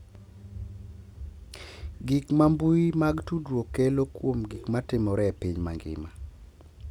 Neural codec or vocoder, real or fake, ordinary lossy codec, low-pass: none; real; none; 19.8 kHz